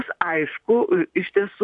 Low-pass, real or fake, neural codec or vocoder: 10.8 kHz; fake; vocoder, 44.1 kHz, 128 mel bands, Pupu-Vocoder